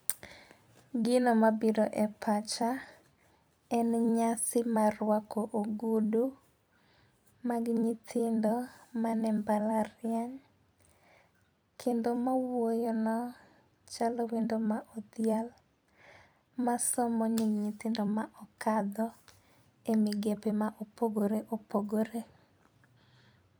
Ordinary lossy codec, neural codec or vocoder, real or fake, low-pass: none; vocoder, 44.1 kHz, 128 mel bands every 256 samples, BigVGAN v2; fake; none